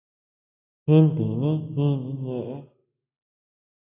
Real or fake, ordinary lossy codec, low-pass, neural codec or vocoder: real; AAC, 16 kbps; 3.6 kHz; none